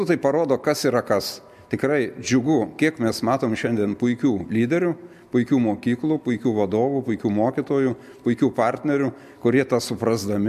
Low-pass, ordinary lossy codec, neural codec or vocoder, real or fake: 14.4 kHz; MP3, 96 kbps; none; real